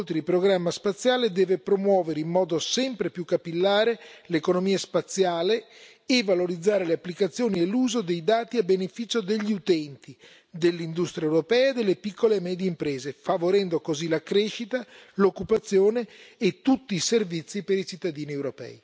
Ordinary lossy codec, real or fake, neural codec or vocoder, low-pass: none; real; none; none